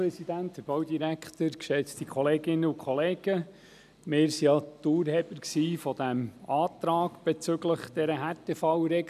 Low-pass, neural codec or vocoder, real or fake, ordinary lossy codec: 14.4 kHz; none; real; none